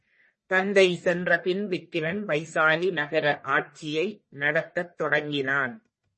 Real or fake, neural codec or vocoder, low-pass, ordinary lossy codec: fake; codec, 44.1 kHz, 1.7 kbps, Pupu-Codec; 10.8 kHz; MP3, 32 kbps